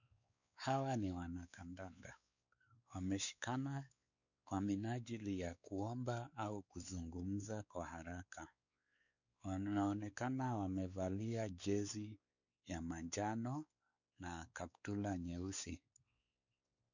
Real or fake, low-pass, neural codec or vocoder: fake; 7.2 kHz; codec, 16 kHz, 4 kbps, X-Codec, WavLM features, trained on Multilingual LibriSpeech